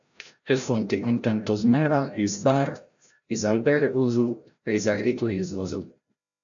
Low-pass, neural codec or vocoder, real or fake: 7.2 kHz; codec, 16 kHz, 0.5 kbps, FreqCodec, larger model; fake